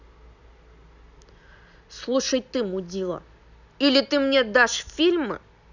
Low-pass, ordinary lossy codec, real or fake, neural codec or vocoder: 7.2 kHz; none; real; none